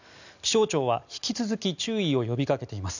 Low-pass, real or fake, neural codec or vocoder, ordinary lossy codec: 7.2 kHz; real; none; none